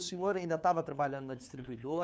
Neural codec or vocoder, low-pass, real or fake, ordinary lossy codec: codec, 16 kHz, 2 kbps, FunCodec, trained on LibriTTS, 25 frames a second; none; fake; none